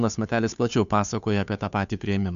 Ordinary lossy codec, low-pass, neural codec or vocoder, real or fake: MP3, 96 kbps; 7.2 kHz; codec, 16 kHz, 2 kbps, FunCodec, trained on Chinese and English, 25 frames a second; fake